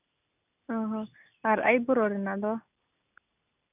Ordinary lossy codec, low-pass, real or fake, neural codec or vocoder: none; 3.6 kHz; real; none